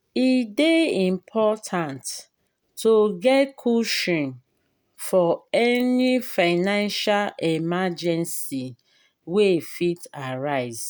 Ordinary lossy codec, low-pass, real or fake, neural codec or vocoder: none; none; real; none